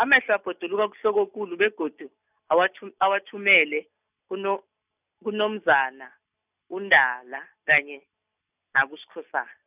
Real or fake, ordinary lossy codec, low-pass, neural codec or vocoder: real; none; 3.6 kHz; none